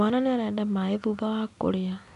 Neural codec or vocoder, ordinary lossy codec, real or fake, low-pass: none; none; real; 10.8 kHz